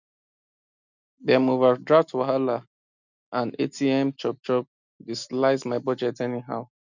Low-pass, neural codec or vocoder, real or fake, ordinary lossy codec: 7.2 kHz; none; real; none